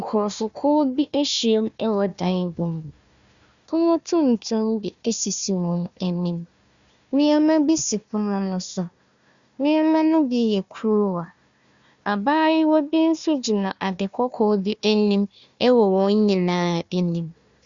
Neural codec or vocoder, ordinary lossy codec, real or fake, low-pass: codec, 16 kHz, 1 kbps, FunCodec, trained on Chinese and English, 50 frames a second; Opus, 64 kbps; fake; 7.2 kHz